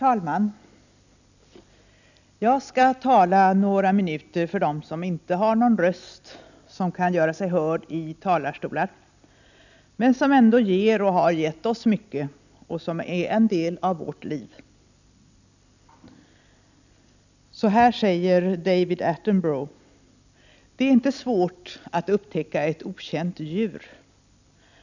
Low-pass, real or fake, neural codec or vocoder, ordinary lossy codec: 7.2 kHz; real; none; none